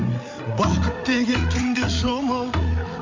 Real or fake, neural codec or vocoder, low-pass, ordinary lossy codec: fake; codec, 16 kHz, 8 kbps, FreqCodec, larger model; 7.2 kHz; MP3, 48 kbps